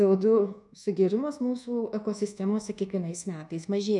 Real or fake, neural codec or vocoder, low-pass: fake; codec, 24 kHz, 1.2 kbps, DualCodec; 10.8 kHz